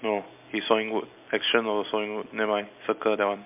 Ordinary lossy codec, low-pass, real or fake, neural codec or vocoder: MP3, 32 kbps; 3.6 kHz; real; none